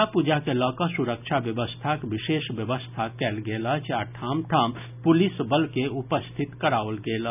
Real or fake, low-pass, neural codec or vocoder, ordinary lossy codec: real; 3.6 kHz; none; none